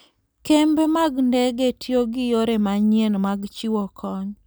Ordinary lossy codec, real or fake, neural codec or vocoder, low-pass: none; real; none; none